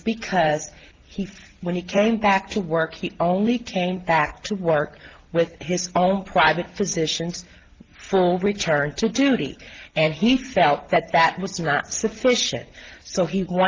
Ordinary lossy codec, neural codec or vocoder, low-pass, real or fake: Opus, 16 kbps; none; 7.2 kHz; real